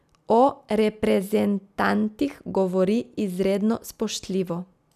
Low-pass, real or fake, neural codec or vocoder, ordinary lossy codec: 14.4 kHz; real; none; none